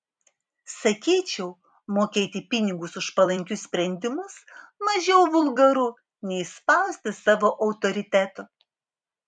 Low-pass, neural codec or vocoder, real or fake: 9.9 kHz; vocoder, 44.1 kHz, 128 mel bands every 256 samples, BigVGAN v2; fake